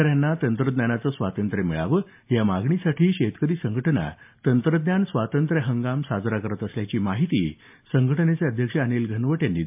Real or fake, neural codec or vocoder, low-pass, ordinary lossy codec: real; none; 3.6 kHz; MP3, 32 kbps